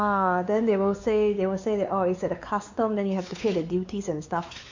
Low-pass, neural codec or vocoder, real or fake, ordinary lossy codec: 7.2 kHz; codec, 16 kHz, 4 kbps, X-Codec, WavLM features, trained on Multilingual LibriSpeech; fake; MP3, 64 kbps